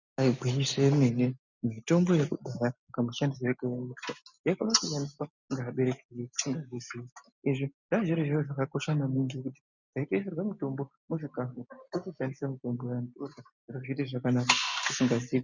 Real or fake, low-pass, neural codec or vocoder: real; 7.2 kHz; none